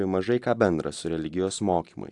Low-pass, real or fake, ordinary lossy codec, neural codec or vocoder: 10.8 kHz; real; AAC, 64 kbps; none